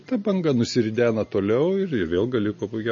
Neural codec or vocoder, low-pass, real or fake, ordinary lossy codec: none; 7.2 kHz; real; MP3, 32 kbps